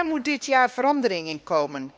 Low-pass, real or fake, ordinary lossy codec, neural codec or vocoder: none; fake; none; codec, 16 kHz, 2 kbps, X-Codec, HuBERT features, trained on LibriSpeech